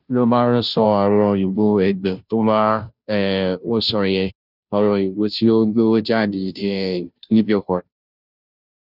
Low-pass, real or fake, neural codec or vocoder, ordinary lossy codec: 5.4 kHz; fake; codec, 16 kHz, 0.5 kbps, FunCodec, trained on Chinese and English, 25 frames a second; none